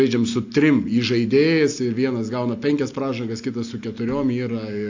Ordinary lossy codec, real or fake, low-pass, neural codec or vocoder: AAC, 48 kbps; real; 7.2 kHz; none